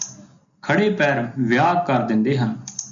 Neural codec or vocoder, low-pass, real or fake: none; 7.2 kHz; real